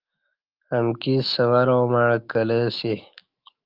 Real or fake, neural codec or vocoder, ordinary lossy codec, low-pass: fake; autoencoder, 48 kHz, 128 numbers a frame, DAC-VAE, trained on Japanese speech; Opus, 32 kbps; 5.4 kHz